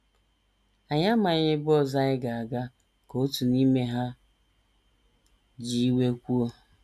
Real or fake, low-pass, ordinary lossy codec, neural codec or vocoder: real; none; none; none